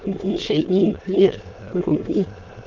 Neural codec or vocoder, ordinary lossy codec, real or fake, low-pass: autoencoder, 22.05 kHz, a latent of 192 numbers a frame, VITS, trained on many speakers; Opus, 32 kbps; fake; 7.2 kHz